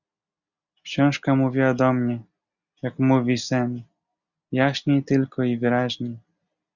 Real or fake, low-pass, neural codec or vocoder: real; 7.2 kHz; none